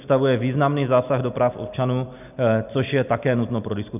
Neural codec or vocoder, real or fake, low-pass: none; real; 3.6 kHz